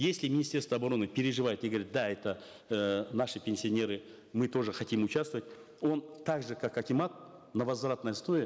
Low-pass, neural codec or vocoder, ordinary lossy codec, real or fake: none; none; none; real